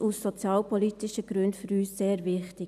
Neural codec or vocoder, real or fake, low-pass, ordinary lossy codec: none; real; 14.4 kHz; none